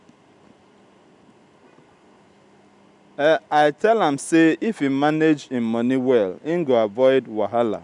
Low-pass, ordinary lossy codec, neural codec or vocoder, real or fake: 10.8 kHz; none; none; real